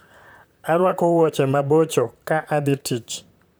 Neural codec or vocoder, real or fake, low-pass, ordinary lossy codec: vocoder, 44.1 kHz, 128 mel bands, Pupu-Vocoder; fake; none; none